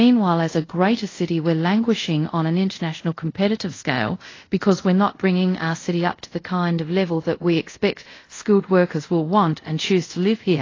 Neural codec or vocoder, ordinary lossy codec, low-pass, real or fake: codec, 24 kHz, 0.5 kbps, DualCodec; AAC, 32 kbps; 7.2 kHz; fake